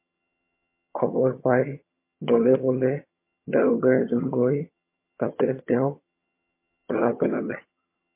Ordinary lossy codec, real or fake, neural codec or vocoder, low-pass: MP3, 24 kbps; fake; vocoder, 22.05 kHz, 80 mel bands, HiFi-GAN; 3.6 kHz